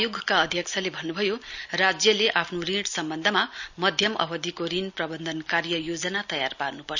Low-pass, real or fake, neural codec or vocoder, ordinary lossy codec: 7.2 kHz; real; none; none